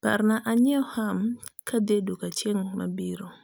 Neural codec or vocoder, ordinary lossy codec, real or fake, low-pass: none; none; real; none